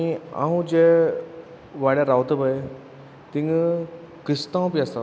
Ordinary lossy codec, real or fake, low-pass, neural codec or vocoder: none; real; none; none